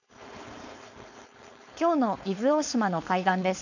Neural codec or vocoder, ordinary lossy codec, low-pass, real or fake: codec, 16 kHz, 4.8 kbps, FACodec; none; 7.2 kHz; fake